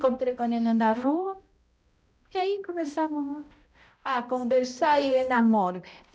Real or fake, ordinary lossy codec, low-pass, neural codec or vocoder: fake; none; none; codec, 16 kHz, 0.5 kbps, X-Codec, HuBERT features, trained on balanced general audio